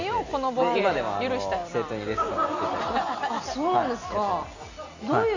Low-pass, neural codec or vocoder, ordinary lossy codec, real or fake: 7.2 kHz; none; AAC, 48 kbps; real